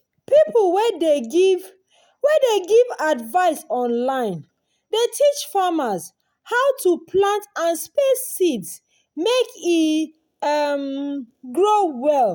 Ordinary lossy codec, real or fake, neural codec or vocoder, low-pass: none; real; none; none